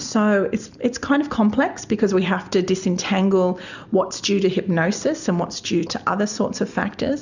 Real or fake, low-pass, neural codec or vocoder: real; 7.2 kHz; none